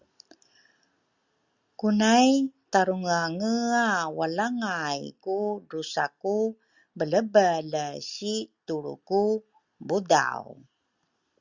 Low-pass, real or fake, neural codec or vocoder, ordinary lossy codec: 7.2 kHz; real; none; Opus, 64 kbps